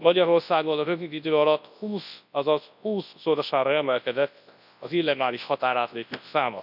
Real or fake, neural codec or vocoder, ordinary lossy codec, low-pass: fake; codec, 24 kHz, 0.9 kbps, WavTokenizer, large speech release; none; 5.4 kHz